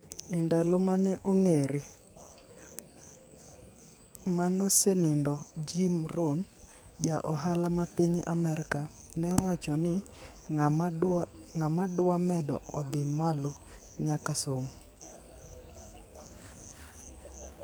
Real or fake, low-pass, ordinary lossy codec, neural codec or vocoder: fake; none; none; codec, 44.1 kHz, 2.6 kbps, SNAC